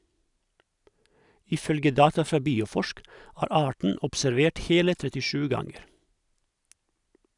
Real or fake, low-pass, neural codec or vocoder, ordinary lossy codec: real; 10.8 kHz; none; none